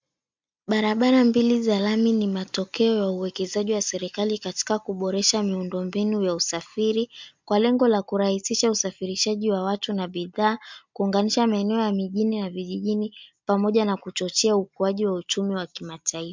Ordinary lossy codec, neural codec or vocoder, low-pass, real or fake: MP3, 64 kbps; none; 7.2 kHz; real